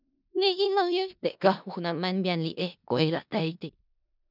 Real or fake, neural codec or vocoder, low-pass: fake; codec, 16 kHz in and 24 kHz out, 0.4 kbps, LongCat-Audio-Codec, four codebook decoder; 5.4 kHz